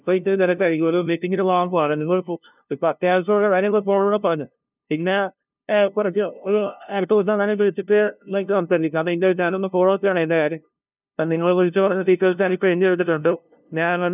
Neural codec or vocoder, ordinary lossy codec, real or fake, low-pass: codec, 16 kHz, 0.5 kbps, FunCodec, trained on LibriTTS, 25 frames a second; none; fake; 3.6 kHz